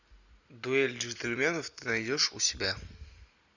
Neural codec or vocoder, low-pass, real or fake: none; 7.2 kHz; real